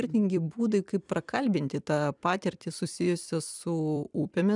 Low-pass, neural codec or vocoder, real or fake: 10.8 kHz; vocoder, 44.1 kHz, 128 mel bands every 256 samples, BigVGAN v2; fake